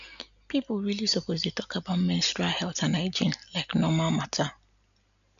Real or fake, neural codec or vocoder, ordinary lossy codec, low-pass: real; none; none; 7.2 kHz